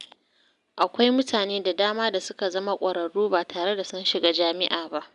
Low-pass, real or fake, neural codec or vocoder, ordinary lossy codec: 10.8 kHz; real; none; none